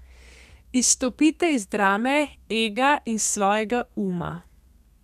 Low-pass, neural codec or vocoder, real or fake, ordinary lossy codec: 14.4 kHz; codec, 32 kHz, 1.9 kbps, SNAC; fake; none